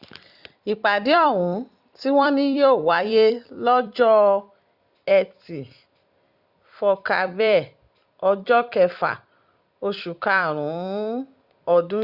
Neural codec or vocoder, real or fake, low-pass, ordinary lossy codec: vocoder, 44.1 kHz, 128 mel bands every 256 samples, BigVGAN v2; fake; 5.4 kHz; Opus, 64 kbps